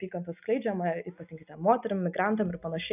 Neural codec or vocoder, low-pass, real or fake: none; 3.6 kHz; real